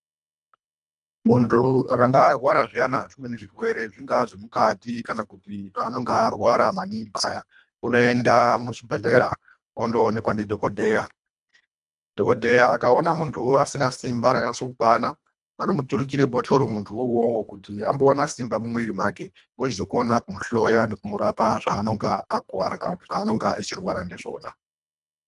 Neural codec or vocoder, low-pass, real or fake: codec, 24 kHz, 1.5 kbps, HILCodec; 10.8 kHz; fake